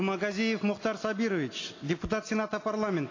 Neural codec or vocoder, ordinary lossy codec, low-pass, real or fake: none; AAC, 32 kbps; 7.2 kHz; real